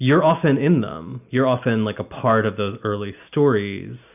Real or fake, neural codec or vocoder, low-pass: real; none; 3.6 kHz